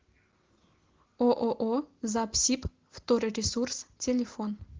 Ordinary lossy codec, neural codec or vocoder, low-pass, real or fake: Opus, 16 kbps; none; 7.2 kHz; real